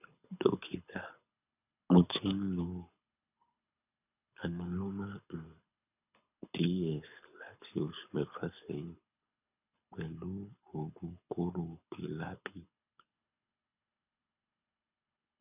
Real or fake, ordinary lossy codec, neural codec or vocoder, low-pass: fake; none; codec, 24 kHz, 6 kbps, HILCodec; 3.6 kHz